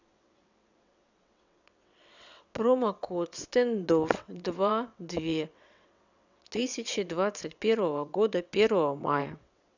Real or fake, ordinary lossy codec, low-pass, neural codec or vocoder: fake; none; 7.2 kHz; vocoder, 22.05 kHz, 80 mel bands, WaveNeXt